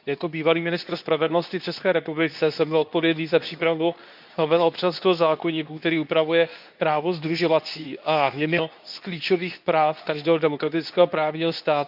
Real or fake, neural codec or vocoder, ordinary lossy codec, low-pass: fake; codec, 24 kHz, 0.9 kbps, WavTokenizer, medium speech release version 2; none; 5.4 kHz